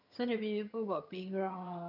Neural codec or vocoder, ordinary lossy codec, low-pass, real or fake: vocoder, 22.05 kHz, 80 mel bands, HiFi-GAN; none; 5.4 kHz; fake